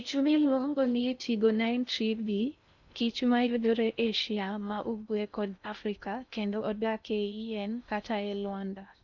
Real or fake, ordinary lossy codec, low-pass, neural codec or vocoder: fake; none; 7.2 kHz; codec, 16 kHz in and 24 kHz out, 0.6 kbps, FocalCodec, streaming, 4096 codes